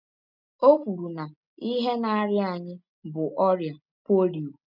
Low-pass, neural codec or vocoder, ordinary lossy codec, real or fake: 5.4 kHz; none; none; real